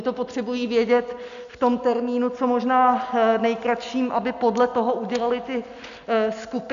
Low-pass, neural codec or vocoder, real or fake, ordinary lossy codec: 7.2 kHz; none; real; AAC, 96 kbps